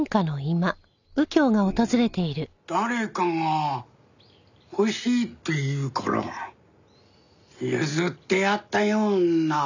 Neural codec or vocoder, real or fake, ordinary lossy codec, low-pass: none; real; none; 7.2 kHz